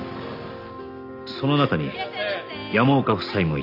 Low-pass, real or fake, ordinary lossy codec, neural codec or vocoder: 5.4 kHz; real; none; none